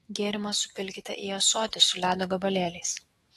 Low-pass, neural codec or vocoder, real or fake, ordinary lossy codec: 19.8 kHz; autoencoder, 48 kHz, 128 numbers a frame, DAC-VAE, trained on Japanese speech; fake; AAC, 32 kbps